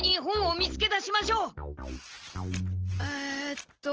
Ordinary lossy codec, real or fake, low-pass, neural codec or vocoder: Opus, 16 kbps; real; 7.2 kHz; none